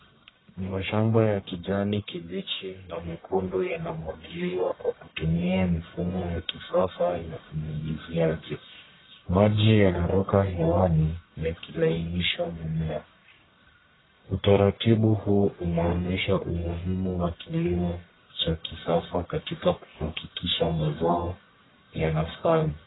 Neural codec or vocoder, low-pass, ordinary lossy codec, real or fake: codec, 44.1 kHz, 1.7 kbps, Pupu-Codec; 7.2 kHz; AAC, 16 kbps; fake